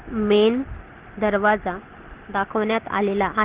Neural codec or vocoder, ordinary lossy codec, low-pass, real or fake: none; Opus, 16 kbps; 3.6 kHz; real